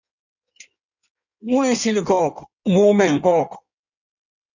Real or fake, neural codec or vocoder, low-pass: fake; codec, 16 kHz in and 24 kHz out, 1.1 kbps, FireRedTTS-2 codec; 7.2 kHz